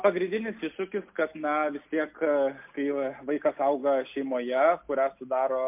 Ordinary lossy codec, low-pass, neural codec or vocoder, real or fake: MP3, 32 kbps; 3.6 kHz; none; real